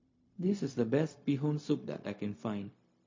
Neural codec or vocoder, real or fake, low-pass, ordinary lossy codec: codec, 16 kHz, 0.4 kbps, LongCat-Audio-Codec; fake; 7.2 kHz; MP3, 32 kbps